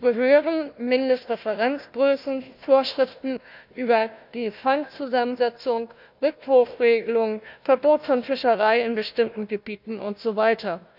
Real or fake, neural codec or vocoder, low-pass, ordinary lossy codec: fake; codec, 16 kHz, 1 kbps, FunCodec, trained on Chinese and English, 50 frames a second; 5.4 kHz; none